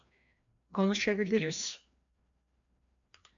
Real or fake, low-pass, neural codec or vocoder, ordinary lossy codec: fake; 7.2 kHz; codec, 16 kHz, 1 kbps, FreqCodec, larger model; AAC, 64 kbps